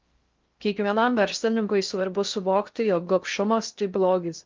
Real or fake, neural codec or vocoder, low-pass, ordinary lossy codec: fake; codec, 16 kHz in and 24 kHz out, 0.6 kbps, FocalCodec, streaming, 4096 codes; 7.2 kHz; Opus, 24 kbps